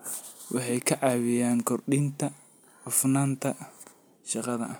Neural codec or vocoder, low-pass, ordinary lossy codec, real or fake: none; none; none; real